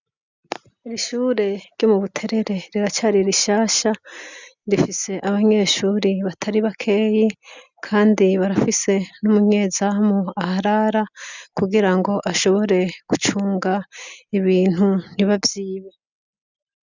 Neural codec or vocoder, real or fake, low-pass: none; real; 7.2 kHz